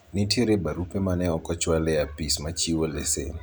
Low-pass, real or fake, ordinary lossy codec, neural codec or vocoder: none; real; none; none